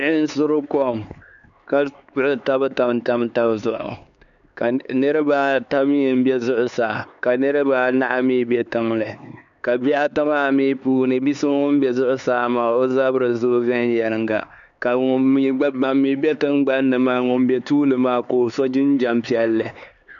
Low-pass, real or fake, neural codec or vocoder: 7.2 kHz; fake; codec, 16 kHz, 4 kbps, X-Codec, HuBERT features, trained on LibriSpeech